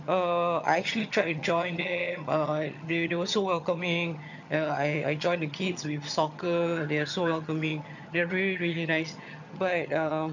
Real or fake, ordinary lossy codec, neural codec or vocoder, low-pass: fake; none; vocoder, 22.05 kHz, 80 mel bands, HiFi-GAN; 7.2 kHz